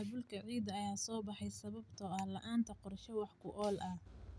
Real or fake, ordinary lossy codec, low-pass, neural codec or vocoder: real; none; none; none